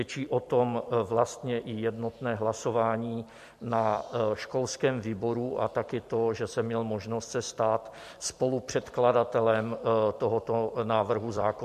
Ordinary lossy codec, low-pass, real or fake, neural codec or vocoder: MP3, 64 kbps; 14.4 kHz; fake; vocoder, 44.1 kHz, 128 mel bands every 256 samples, BigVGAN v2